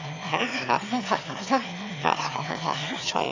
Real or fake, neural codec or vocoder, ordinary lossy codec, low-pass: fake; autoencoder, 22.05 kHz, a latent of 192 numbers a frame, VITS, trained on one speaker; AAC, 48 kbps; 7.2 kHz